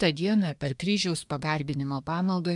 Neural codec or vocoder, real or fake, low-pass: codec, 24 kHz, 1 kbps, SNAC; fake; 10.8 kHz